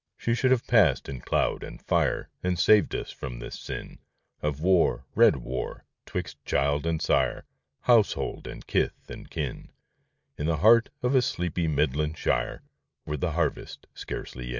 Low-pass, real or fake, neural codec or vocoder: 7.2 kHz; real; none